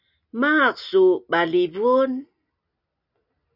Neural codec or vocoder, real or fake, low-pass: none; real; 5.4 kHz